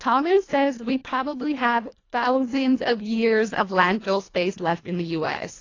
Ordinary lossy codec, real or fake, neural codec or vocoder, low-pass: AAC, 32 kbps; fake; codec, 24 kHz, 1.5 kbps, HILCodec; 7.2 kHz